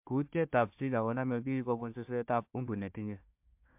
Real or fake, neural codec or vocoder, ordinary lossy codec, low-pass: fake; codec, 16 kHz, 1 kbps, FunCodec, trained on Chinese and English, 50 frames a second; MP3, 32 kbps; 3.6 kHz